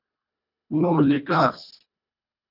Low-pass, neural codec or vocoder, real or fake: 5.4 kHz; codec, 24 kHz, 1.5 kbps, HILCodec; fake